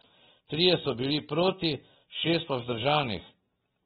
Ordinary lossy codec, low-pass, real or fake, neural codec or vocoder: AAC, 16 kbps; 10.8 kHz; real; none